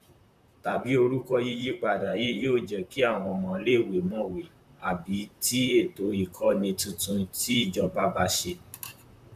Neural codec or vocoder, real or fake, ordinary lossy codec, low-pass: vocoder, 44.1 kHz, 128 mel bands, Pupu-Vocoder; fake; none; 14.4 kHz